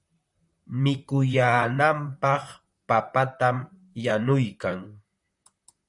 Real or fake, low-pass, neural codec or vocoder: fake; 10.8 kHz; vocoder, 44.1 kHz, 128 mel bands, Pupu-Vocoder